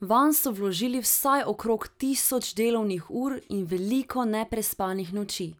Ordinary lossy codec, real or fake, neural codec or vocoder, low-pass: none; real; none; none